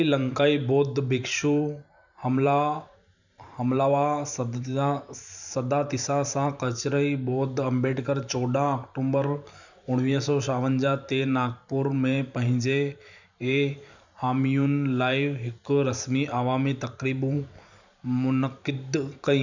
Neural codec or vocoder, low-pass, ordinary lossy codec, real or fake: vocoder, 44.1 kHz, 128 mel bands every 512 samples, BigVGAN v2; 7.2 kHz; none; fake